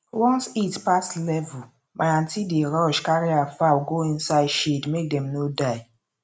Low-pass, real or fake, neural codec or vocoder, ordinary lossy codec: none; real; none; none